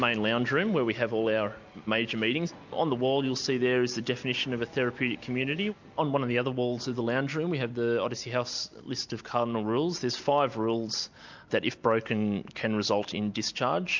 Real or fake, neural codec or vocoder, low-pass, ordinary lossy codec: real; none; 7.2 kHz; MP3, 64 kbps